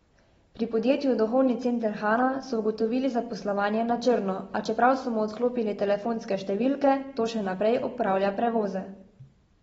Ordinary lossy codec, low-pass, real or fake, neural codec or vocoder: AAC, 24 kbps; 14.4 kHz; real; none